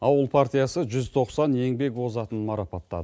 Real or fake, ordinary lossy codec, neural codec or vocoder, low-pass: real; none; none; none